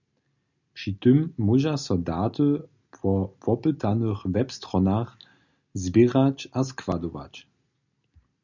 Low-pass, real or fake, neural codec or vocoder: 7.2 kHz; real; none